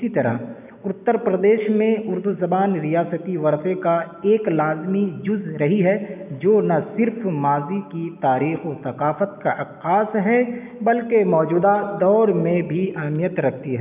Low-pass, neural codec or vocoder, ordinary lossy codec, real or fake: 3.6 kHz; none; none; real